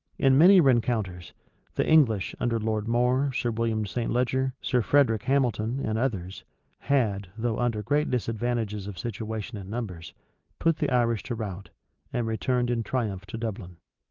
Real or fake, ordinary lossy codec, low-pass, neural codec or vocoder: real; Opus, 32 kbps; 7.2 kHz; none